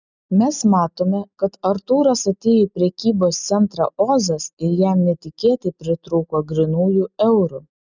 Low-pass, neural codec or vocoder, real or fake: 7.2 kHz; none; real